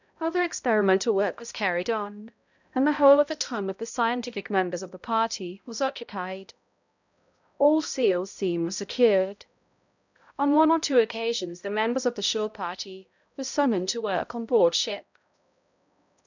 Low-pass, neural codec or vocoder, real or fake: 7.2 kHz; codec, 16 kHz, 0.5 kbps, X-Codec, HuBERT features, trained on balanced general audio; fake